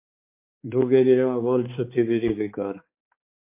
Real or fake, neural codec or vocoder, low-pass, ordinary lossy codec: fake; codec, 16 kHz, 4 kbps, X-Codec, HuBERT features, trained on balanced general audio; 3.6 kHz; MP3, 24 kbps